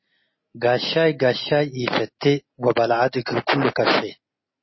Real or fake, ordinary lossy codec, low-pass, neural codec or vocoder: real; MP3, 24 kbps; 7.2 kHz; none